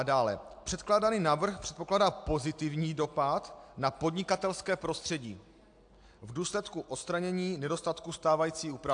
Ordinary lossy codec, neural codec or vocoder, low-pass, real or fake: AAC, 64 kbps; none; 9.9 kHz; real